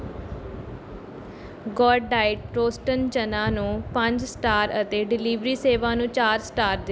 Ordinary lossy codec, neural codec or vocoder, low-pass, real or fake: none; none; none; real